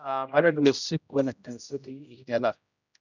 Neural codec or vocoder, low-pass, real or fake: codec, 16 kHz, 0.5 kbps, X-Codec, HuBERT features, trained on general audio; 7.2 kHz; fake